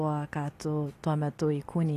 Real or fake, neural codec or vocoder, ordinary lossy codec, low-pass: real; none; AAC, 48 kbps; 14.4 kHz